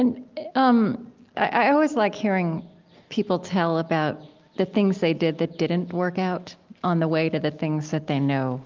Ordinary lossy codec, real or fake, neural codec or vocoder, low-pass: Opus, 32 kbps; real; none; 7.2 kHz